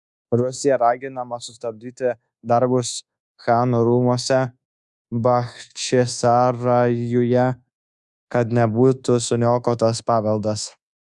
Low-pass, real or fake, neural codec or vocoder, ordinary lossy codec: 10.8 kHz; fake; codec, 24 kHz, 1.2 kbps, DualCodec; Opus, 64 kbps